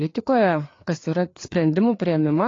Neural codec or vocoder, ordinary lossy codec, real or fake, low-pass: codec, 16 kHz, 4 kbps, FreqCodec, larger model; AAC, 32 kbps; fake; 7.2 kHz